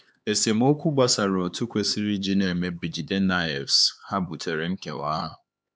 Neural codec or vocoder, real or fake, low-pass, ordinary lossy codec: codec, 16 kHz, 4 kbps, X-Codec, HuBERT features, trained on LibriSpeech; fake; none; none